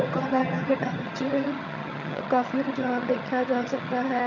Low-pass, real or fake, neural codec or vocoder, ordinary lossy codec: 7.2 kHz; fake; vocoder, 22.05 kHz, 80 mel bands, HiFi-GAN; none